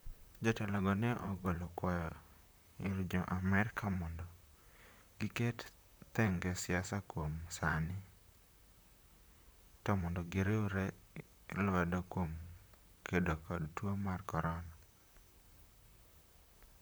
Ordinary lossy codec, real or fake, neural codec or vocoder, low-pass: none; fake; vocoder, 44.1 kHz, 128 mel bands, Pupu-Vocoder; none